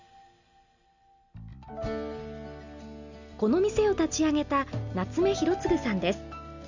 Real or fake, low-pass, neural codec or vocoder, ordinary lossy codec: real; 7.2 kHz; none; none